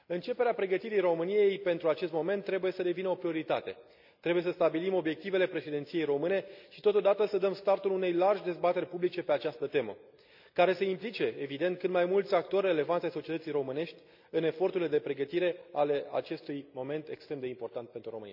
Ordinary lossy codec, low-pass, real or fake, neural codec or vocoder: none; 5.4 kHz; real; none